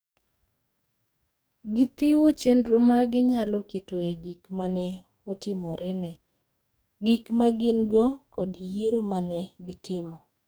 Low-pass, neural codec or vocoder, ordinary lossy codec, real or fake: none; codec, 44.1 kHz, 2.6 kbps, DAC; none; fake